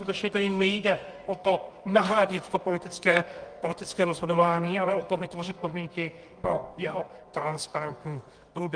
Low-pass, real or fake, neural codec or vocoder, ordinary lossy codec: 9.9 kHz; fake; codec, 24 kHz, 0.9 kbps, WavTokenizer, medium music audio release; Opus, 32 kbps